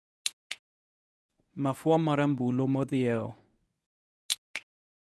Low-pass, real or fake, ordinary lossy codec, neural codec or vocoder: none; fake; none; codec, 24 kHz, 0.9 kbps, WavTokenizer, medium speech release version 1